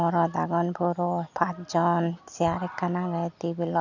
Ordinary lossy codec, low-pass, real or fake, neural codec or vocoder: none; 7.2 kHz; real; none